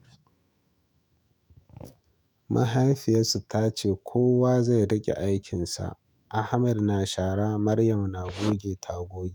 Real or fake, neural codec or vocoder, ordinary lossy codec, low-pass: fake; autoencoder, 48 kHz, 128 numbers a frame, DAC-VAE, trained on Japanese speech; none; none